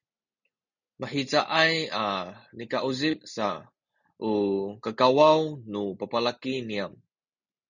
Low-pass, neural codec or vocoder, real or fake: 7.2 kHz; none; real